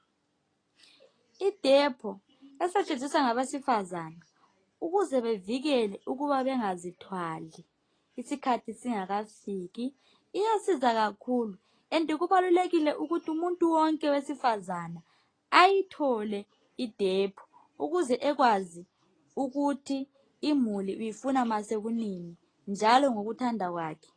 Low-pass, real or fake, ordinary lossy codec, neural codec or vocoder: 9.9 kHz; real; AAC, 32 kbps; none